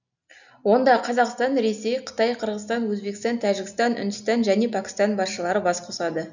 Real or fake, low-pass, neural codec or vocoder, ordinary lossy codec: real; 7.2 kHz; none; none